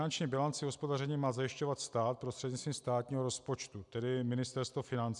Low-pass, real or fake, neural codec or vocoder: 10.8 kHz; real; none